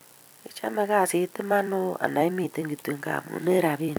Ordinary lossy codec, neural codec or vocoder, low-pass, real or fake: none; vocoder, 44.1 kHz, 128 mel bands every 256 samples, BigVGAN v2; none; fake